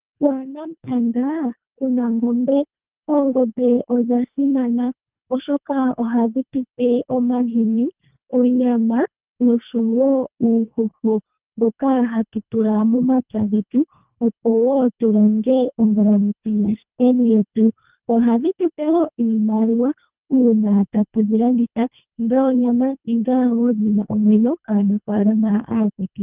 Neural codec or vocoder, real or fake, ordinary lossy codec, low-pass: codec, 24 kHz, 1.5 kbps, HILCodec; fake; Opus, 32 kbps; 3.6 kHz